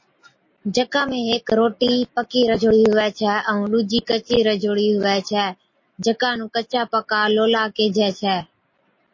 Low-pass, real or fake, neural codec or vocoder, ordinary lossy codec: 7.2 kHz; real; none; MP3, 32 kbps